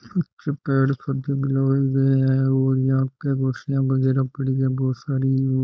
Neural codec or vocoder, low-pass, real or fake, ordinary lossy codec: codec, 16 kHz, 4.8 kbps, FACodec; none; fake; none